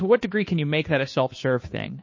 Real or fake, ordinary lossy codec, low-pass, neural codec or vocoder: fake; MP3, 32 kbps; 7.2 kHz; codec, 16 kHz, 2 kbps, FunCodec, trained on Chinese and English, 25 frames a second